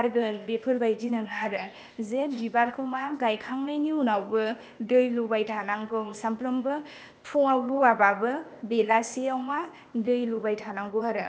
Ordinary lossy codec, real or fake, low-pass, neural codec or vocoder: none; fake; none; codec, 16 kHz, 0.8 kbps, ZipCodec